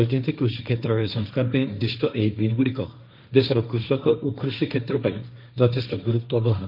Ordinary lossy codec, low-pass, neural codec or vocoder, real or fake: none; 5.4 kHz; codec, 16 kHz, 1.1 kbps, Voila-Tokenizer; fake